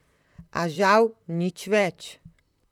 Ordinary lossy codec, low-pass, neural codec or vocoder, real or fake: none; 19.8 kHz; vocoder, 44.1 kHz, 128 mel bands, Pupu-Vocoder; fake